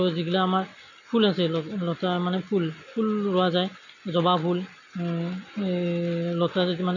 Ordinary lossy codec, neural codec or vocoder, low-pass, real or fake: none; none; 7.2 kHz; real